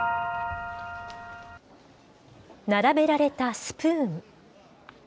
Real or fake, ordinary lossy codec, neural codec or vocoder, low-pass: real; none; none; none